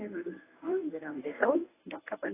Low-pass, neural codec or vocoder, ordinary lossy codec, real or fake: 3.6 kHz; codec, 24 kHz, 0.9 kbps, WavTokenizer, medium speech release version 1; AAC, 16 kbps; fake